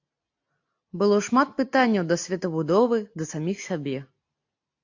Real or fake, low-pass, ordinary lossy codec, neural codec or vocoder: real; 7.2 kHz; AAC, 48 kbps; none